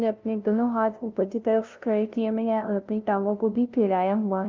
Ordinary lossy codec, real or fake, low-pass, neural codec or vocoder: Opus, 32 kbps; fake; 7.2 kHz; codec, 16 kHz, 0.5 kbps, FunCodec, trained on LibriTTS, 25 frames a second